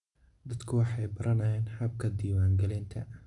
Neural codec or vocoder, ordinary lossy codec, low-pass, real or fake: none; none; 10.8 kHz; real